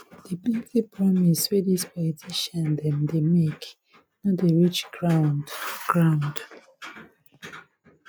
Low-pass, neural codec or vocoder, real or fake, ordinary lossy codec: none; none; real; none